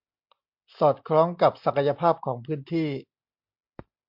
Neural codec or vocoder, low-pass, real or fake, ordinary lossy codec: none; 5.4 kHz; real; MP3, 48 kbps